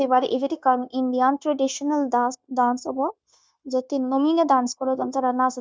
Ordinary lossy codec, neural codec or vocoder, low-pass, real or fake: none; codec, 16 kHz, 0.9 kbps, LongCat-Audio-Codec; none; fake